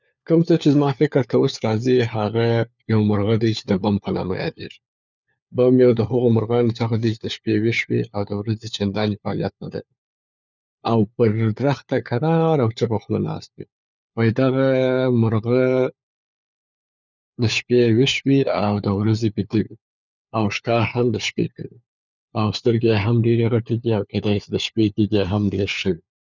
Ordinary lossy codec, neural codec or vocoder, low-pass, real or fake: none; codec, 16 kHz, 4 kbps, FunCodec, trained on LibriTTS, 50 frames a second; 7.2 kHz; fake